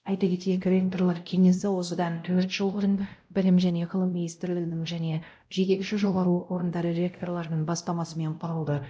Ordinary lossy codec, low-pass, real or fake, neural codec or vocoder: none; none; fake; codec, 16 kHz, 0.5 kbps, X-Codec, WavLM features, trained on Multilingual LibriSpeech